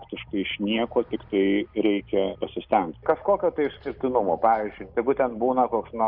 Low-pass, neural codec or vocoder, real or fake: 5.4 kHz; none; real